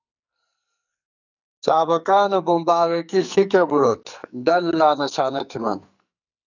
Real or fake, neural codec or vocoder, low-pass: fake; codec, 44.1 kHz, 2.6 kbps, SNAC; 7.2 kHz